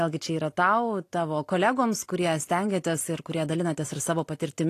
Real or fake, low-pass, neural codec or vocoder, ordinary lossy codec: real; 14.4 kHz; none; AAC, 48 kbps